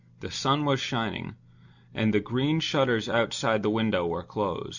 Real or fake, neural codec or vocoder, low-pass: real; none; 7.2 kHz